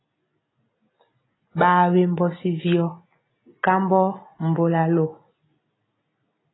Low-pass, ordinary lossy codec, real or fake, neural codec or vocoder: 7.2 kHz; AAC, 16 kbps; real; none